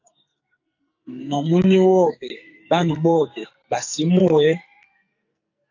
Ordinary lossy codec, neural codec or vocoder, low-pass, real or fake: AAC, 48 kbps; codec, 44.1 kHz, 2.6 kbps, SNAC; 7.2 kHz; fake